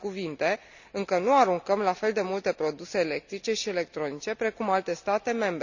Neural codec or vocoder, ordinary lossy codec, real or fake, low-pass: none; none; real; 7.2 kHz